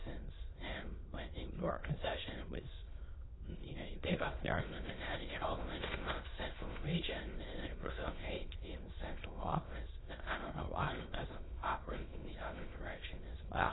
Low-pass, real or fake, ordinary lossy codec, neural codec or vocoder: 7.2 kHz; fake; AAC, 16 kbps; autoencoder, 22.05 kHz, a latent of 192 numbers a frame, VITS, trained on many speakers